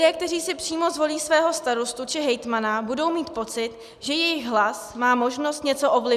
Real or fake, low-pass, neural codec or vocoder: real; 14.4 kHz; none